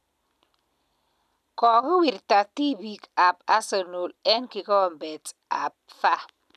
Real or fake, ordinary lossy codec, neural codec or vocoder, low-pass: real; none; none; 14.4 kHz